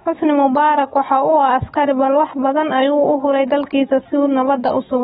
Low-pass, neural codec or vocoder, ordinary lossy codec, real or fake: 19.8 kHz; autoencoder, 48 kHz, 128 numbers a frame, DAC-VAE, trained on Japanese speech; AAC, 16 kbps; fake